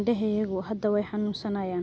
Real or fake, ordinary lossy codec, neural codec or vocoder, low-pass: real; none; none; none